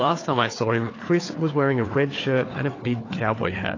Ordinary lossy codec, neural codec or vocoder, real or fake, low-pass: AAC, 32 kbps; codec, 16 kHz, 4 kbps, FunCodec, trained on Chinese and English, 50 frames a second; fake; 7.2 kHz